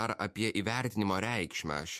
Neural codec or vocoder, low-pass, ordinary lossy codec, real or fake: autoencoder, 48 kHz, 128 numbers a frame, DAC-VAE, trained on Japanese speech; 14.4 kHz; MP3, 64 kbps; fake